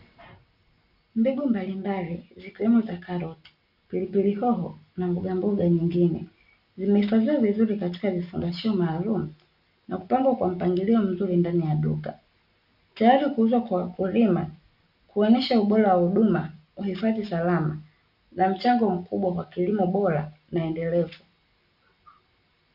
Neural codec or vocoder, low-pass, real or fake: none; 5.4 kHz; real